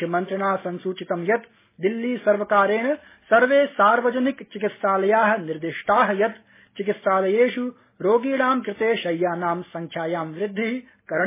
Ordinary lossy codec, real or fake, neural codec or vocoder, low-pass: MP3, 16 kbps; real; none; 3.6 kHz